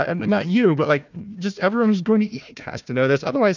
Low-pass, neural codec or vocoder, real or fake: 7.2 kHz; codec, 16 kHz, 1 kbps, FunCodec, trained on Chinese and English, 50 frames a second; fake